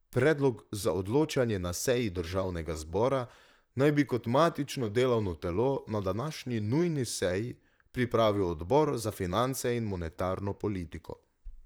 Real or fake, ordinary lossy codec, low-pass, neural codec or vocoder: fake; none; none; vocoder, 44.1 kHz, 128 mel bands, Pupu-Vocoder